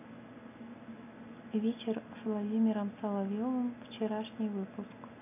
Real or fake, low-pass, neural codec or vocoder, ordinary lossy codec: real; 3.6 kHz; none; none